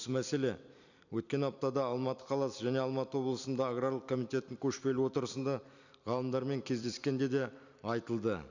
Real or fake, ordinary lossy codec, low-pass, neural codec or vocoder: fake; none; 7.2 kHz; vocoder, 44.1 kHz, 128 mel bands every 256 samples, BigVGAN v2